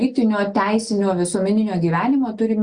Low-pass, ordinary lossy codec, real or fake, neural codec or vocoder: 9.9 kHz; Opus, 64 kbps; real; none